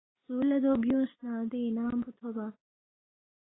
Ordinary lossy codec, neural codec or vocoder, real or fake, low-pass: AAC, 16 kbps; none; real; 7.2 kHz